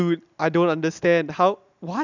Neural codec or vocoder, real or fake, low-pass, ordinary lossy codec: none; real; 7.2 kHz; none